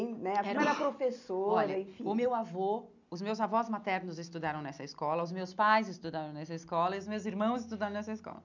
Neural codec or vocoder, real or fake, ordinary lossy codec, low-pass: none; real; none; 7.2 kHz